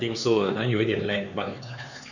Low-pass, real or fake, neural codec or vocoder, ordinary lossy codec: 7.2 kHz; fake; codec, 16 kHz, 4 kbps, X-Codec, HuBERT features, trained on LibriSpeech; none